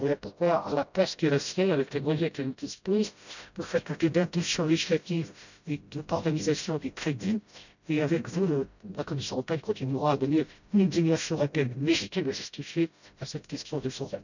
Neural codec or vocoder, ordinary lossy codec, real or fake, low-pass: codec, 16 kHz, 0.5 kbps, FreqCodec, smaller model; none; fake; 7.2 kHz